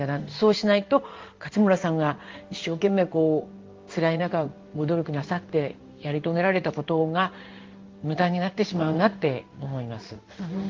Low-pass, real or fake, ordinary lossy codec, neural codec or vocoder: 7.2 kHz; fake; Opus, 32 kbps; codec, 16 kHz in and 24 kHz out, 1 kbps, XY-Tokenizer